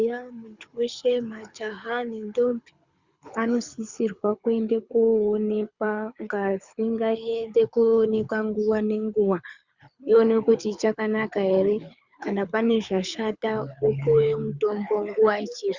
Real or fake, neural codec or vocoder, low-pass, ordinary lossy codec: fake; codec, 24 kHz, 6 kbps, HILCodec; 7.2 kHz; Opus, 64 kbps